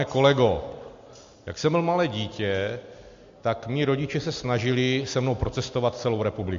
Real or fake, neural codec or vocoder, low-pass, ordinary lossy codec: real; none; 7.2 kHz; MP3, 48 kbps